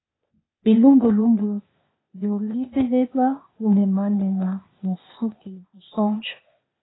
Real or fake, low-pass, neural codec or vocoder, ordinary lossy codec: fake; 7.2 kHz; codec, 16 kHz, 0.8 kbps, ZipCodec; AAC, 16 kbps